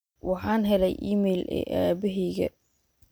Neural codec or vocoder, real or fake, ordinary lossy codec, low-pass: none; real; none; none